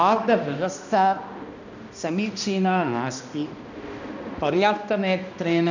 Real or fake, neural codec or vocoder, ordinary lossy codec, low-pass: fake; codec, 16 kHz, 1 kbps, X-Codec, HuBERT features, trained on balanced general audio; none; 7.2 kHz